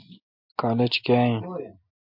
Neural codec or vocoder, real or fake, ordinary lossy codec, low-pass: none; real; MP3, 48 kbps; 5.4 kHz